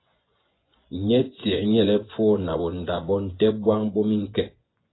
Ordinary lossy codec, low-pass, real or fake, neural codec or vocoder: AAC, 16 kbps; 7.2 kHz; fake; vocoder, 24 kHz, 100 mel bands, Vocos